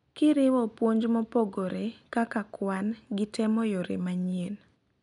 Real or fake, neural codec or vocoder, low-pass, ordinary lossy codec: real; none; 10.8 kHz; none